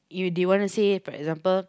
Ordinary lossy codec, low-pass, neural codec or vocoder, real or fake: none; none; none; real